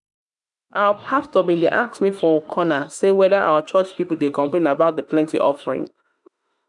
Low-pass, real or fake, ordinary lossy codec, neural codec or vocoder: 10.8 kHz; fake; none; autoencoder, 48 kHz, 32 numbers a frame, DAC-VAE, trained on Japanese speech